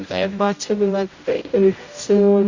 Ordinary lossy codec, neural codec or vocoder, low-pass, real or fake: Opus, 64 kbps; codec, 16 kHz, 0.5 kbps, X-Codec, HuBERT features, trained on general audio; 7.2 kHz; fake